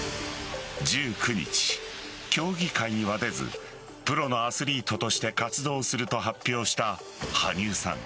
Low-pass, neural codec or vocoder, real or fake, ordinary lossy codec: none; none; real; none